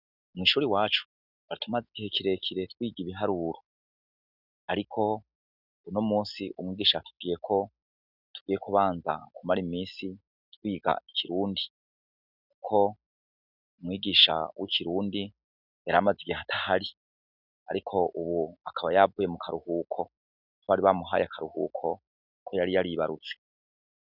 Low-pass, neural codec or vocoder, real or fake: 5.4 kHz; none; real